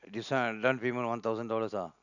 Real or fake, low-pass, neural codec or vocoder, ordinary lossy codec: real; 7.2 kHz; none; none